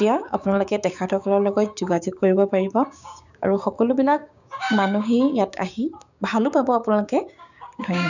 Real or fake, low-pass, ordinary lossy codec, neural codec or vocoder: fake; 7.2 kHz; none; codec, 16 kHz, 6 kbps, DAC